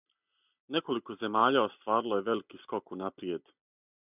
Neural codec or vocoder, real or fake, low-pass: none; real; 3.6 kHz